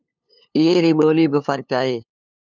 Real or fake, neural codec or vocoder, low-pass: fake; codec, 16 kHz, 2 kbps, FunCodec, trained on LibriTTS, 25 frames a second; 7.2 kHz